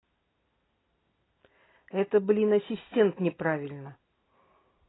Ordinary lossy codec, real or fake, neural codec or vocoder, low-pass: AAC, 16 kbps; real; none; 7.2 kHz